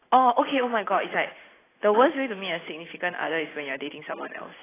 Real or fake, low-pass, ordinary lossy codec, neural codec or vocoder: real; 3.6 kHz; AAC, 16 kbps; none